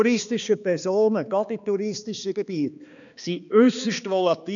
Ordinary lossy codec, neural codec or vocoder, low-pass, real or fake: none; codec, 16 kHz, 2 kbps, X-Codec, HuBERT features, trained on balanced general audio; 7.2 kHz; fake